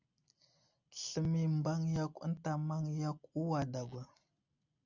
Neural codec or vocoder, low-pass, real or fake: none; 7.2 kHz; real